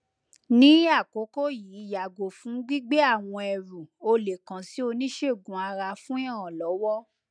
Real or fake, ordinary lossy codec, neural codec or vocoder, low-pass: real; none; none; 9.9 kHz